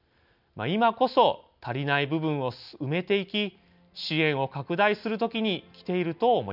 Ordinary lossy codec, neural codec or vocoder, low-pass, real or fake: none; none; 5.4 kHz; real